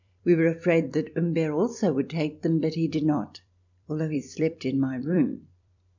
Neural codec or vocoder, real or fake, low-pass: vocoder, 44.1 kHz, 128 mel bands every 256 samples, BigVGAN v2; fake; 7.2 kHz